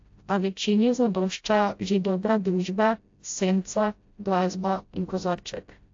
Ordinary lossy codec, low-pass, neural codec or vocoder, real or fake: AAC, 48 kbps; 7.2 kHz; codec, 16 kHz, 0.5 kbps, FreqCodec, smaller model; fake